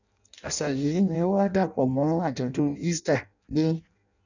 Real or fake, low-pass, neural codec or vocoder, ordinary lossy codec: fake; 7.2 kHz; codec, 16 kHz in and 24 kHz out, 0.6 kbps, FireRedTTS-2 codec; none